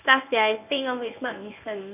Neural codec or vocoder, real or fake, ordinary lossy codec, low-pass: codec, 24 kHz, 0.9 kbps, WavTokenizer, medium speech release version 1; fake; none; 3.6 kHz